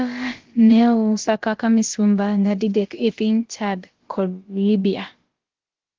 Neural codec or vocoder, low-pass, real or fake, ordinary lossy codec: codec, 16 kHz, about 1 kbps, DyCAST, with the encoder's durations; 7.2 kHz; fake; Opus, 16 kbps